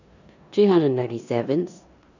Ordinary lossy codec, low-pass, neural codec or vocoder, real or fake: none; 7.2 kHz; codec, 16 kHz in and 24 kHz out, 0.9 kbps, LongCat-Audio-Codec, four codebook decoder; fake